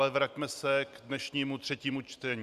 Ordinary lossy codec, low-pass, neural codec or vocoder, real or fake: Opus, 64 kbps; 14.4 kHz; none; real